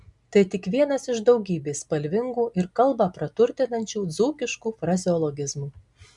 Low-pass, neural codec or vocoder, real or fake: 10.8 kHz; none; real